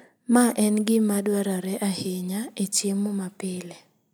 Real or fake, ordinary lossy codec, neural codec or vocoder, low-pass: real; none; none; none